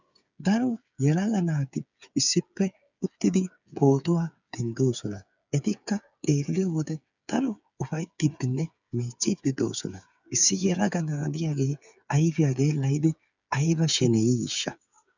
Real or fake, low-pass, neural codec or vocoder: fake; 7.2 kHz; codec, 16 kHz, 8 kbps, FreqCodec, smaller model